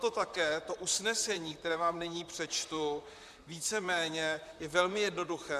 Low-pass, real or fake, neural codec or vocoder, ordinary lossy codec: 14.4 kHz; fake; vocoder, 44.1 kHz, 128 mel bands, Pupu-Vocoder; MP3, 96 kbps